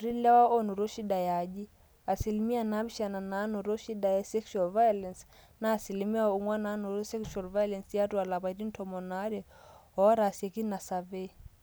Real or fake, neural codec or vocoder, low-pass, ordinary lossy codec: real; none; none; none